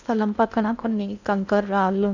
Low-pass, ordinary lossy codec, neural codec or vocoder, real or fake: 7.2 kHz; none; codec, 16 kHz in and 24 kHz out, 0.6 kbps, FocalCodec, streaming, 4096 codes; fake